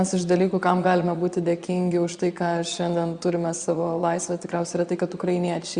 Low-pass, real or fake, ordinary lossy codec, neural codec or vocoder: 9.9 kHz; fake; Opus, 64 kbps; vocoder, 22.05 kHz, 80 mel bands, Vocos